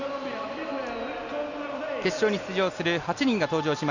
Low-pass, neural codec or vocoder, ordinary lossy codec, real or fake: 7.2 kHz; none; Opus, 64 kbps; real